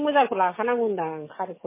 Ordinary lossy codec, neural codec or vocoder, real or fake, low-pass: MP3, 16 kbps; codec, 44.1 kHz, 7.8 kbps, DAC; fake; 3.6 kHz